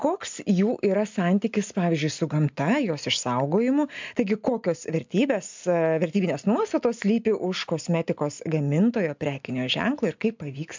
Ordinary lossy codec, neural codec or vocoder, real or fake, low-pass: MP3, 64 kbps; none; real; 7.2 kHz